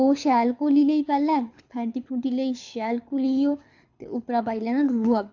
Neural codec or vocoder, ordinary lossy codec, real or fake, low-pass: codec, 24 kHz, 6 kbps, HILCodec; AAC, 48 kbps; fake; 7.2 kHz